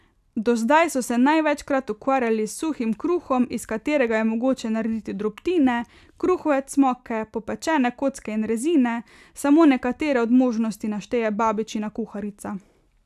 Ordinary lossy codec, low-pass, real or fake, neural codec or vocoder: none; 14.4 kHz; real; none